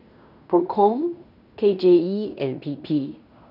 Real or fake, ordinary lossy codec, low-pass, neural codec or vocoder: fake; none; 5.4 kHz; codec, 16 kHz in and 24 kHz out, 0.9 kbps, LongCat-Audio-Codec, fine tuned four codebook decoder